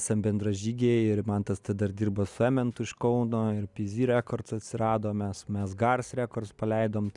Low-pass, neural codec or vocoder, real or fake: 10.8 kHz; none; real